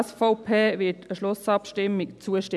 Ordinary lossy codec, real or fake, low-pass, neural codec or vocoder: none; real; none; none